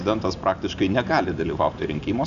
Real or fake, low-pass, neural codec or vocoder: real; 7.2 kHz; none